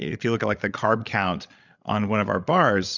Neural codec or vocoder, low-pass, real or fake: codec, 16 kHz, 8 kbps, FreqCodec, larger model; 7.2 kHz; fake